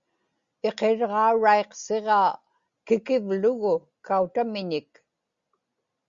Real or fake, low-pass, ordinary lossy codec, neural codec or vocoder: real; 7.2 kHz; Opus, 64 kbps; none